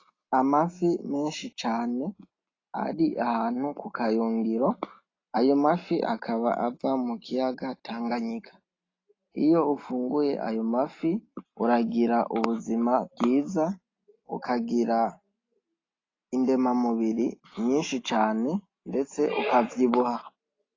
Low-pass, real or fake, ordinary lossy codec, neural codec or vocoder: 7.2 kHz; real; AAC, 32 kbps; none